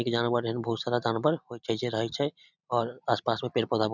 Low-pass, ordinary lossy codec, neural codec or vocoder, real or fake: 7.2 kHz; none; none; real